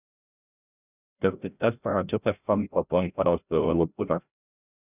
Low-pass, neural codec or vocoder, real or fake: 3.6 kHz; codec, 16 kHz, 0.5 kbps, FreqCodec, larger model; fake